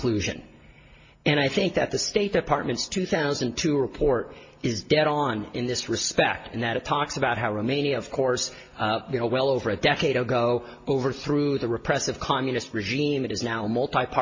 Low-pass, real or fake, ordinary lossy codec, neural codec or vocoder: 7.2 kHz; real; MP3, 32 kbps; none